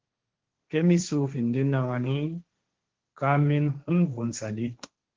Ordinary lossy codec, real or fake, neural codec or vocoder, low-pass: Opus, 16 kbps; fake; codec, 16 kHz, 1.1 kbps, Voila-Tokenizer; 7.2 kHz